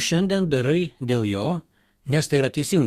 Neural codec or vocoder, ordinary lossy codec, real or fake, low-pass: codec, 32 kHz, 1.9 kbps, SNAC; Opus, 64 kbps; fake; 14.4 kHz